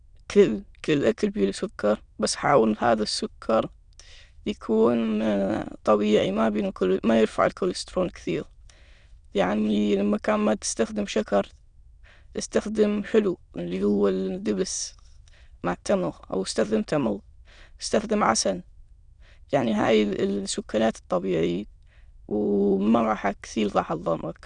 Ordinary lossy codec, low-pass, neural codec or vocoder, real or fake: none; 9.9 kHz; autoencoder, 22.05 kHz, a latent of 192 numbers a frame, VITS, trained on many speakers; fake